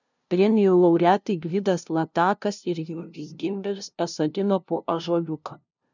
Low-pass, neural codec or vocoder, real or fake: 7.2 kHz; codec, 16 kHz, 0.5 kbps, FunCodec, trained on LibriTTS, 25 frames a second; fake